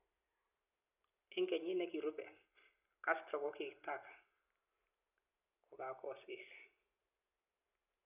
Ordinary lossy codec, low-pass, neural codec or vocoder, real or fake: none; 3.6 kHz; none; real